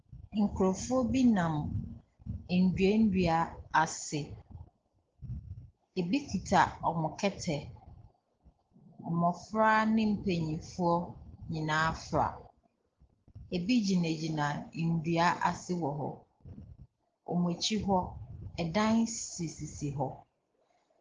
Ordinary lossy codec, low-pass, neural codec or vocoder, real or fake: Opus, 16 kbps; 7.2 kHz; none; real